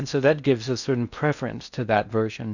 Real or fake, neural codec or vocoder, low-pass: fake; codec, 16 kHz in and 24 kHz out, 0.6 kbps, FocalCodec, streaming, 4096 codes; 7.2 kHz